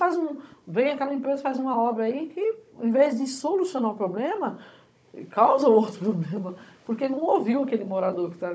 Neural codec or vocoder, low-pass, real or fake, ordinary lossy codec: codec, 16 kHz, 16 kbps, FunCodec, trained on Chinese and English, 50 frames a second; none; fake; none